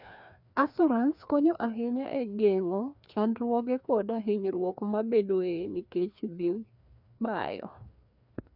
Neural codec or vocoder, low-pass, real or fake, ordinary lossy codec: codec, 16 kHz, 2 kbps, FreqCodec, larger model; 5.4 kHz; fake; none